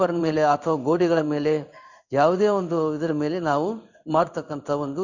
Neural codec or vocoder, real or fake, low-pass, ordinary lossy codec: codec, 16 kHz in and 24 kHz out, 1 kbps, XY-Tokenizer; fake; 7.2 kHz; none